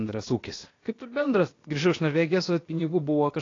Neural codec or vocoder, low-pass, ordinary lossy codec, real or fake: codec, 16 kHz, about 1 kbps, DyCAST, with the encoder's durations; 7.2 kHz; AAC, 32 kbps; fake